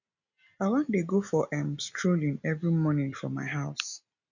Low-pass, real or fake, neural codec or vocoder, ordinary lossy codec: 7.2 kHz; real; none; none